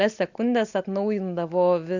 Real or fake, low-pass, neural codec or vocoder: real; 7.2 kHz; none